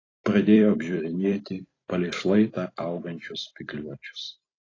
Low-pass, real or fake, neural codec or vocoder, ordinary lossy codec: 7.2 kHz; fake; vocoder, 44.1 kHz, 128 mel bands every 256 samples, BigVGAN v2; AAC, 32 kbps